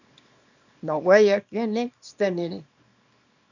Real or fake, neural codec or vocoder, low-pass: fake; codec, 24 kHz, 0.9 kbps, WavTokenizer, small release; 7.2 kHz